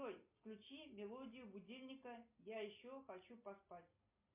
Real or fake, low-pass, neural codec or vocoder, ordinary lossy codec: real; 3.6 kHz; none; MP3, 32 kbps